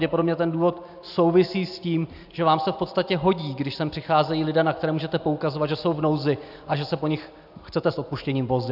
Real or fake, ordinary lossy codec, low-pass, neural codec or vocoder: real; AAC, 48 kbps; 5.4 kHz; none